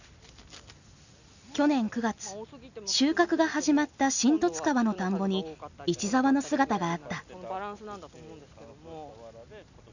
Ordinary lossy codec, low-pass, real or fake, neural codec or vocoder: none; 7.2 kHz; real; none